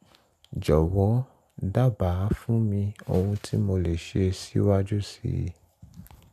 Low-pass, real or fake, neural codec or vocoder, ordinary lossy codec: 14.4 kHz; real; none; none